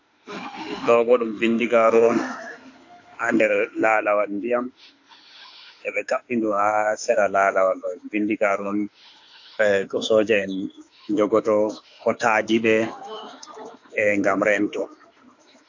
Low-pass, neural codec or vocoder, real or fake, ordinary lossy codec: 7.2 kHz; autoencoder, 48 kHz, 32 numbers a frame, DAC-VAE, trained on Japanese speech; fake; AAC, 48 kbps